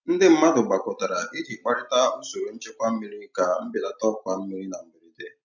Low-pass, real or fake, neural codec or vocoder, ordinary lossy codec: 7.2 kHz; real; none; none